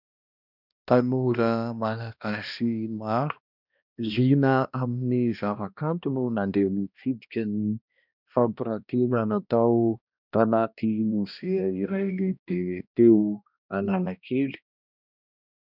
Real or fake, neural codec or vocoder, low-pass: fake; codec, 16 kHz, 1 kbps, X-Codec, HuBERT features, trained on balanced general audio; 5.4 kHz